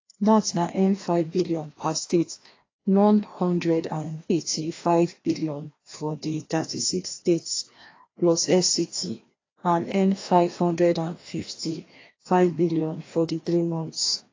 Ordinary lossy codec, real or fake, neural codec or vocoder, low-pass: AAC, 32 kbps; fake; codec, 16 kHz, 1 kbps, FreqCodec, larger model; 7.2 kHz